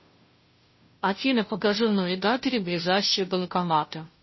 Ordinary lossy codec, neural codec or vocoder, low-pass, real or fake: MP3, 24 kbps; codec, 16 kHz, 0.5 kbps, FunCodec, trained on Chinese and English, 25 frames a second; 7.2 kHz; fake